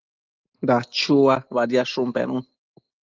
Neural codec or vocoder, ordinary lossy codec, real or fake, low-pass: none; Opus, 32 kbps; real; 7.2 kHz